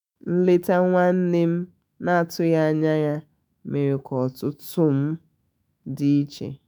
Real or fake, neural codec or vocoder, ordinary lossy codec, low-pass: fake; autoencoder, 48 kHz, 128 numbers a frame, DAC-VAE, trained on Japanese speech; none; none